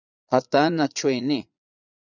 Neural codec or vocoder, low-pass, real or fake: vocoder, 22.05 kHz, 80 mel bands, Vocos; 7.2 kHz; fake